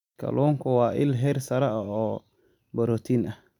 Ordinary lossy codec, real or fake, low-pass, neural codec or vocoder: none; real; 19.8 kHz; none